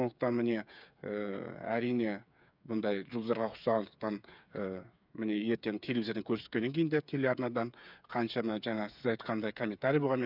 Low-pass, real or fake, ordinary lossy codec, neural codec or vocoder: 5.4 kHz; fake; none; codec, 16 kHz, 8 kbps, FreqCodec, smaller model